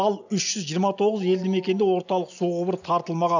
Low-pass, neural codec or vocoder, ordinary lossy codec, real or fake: 7.2 kHz; none; none; real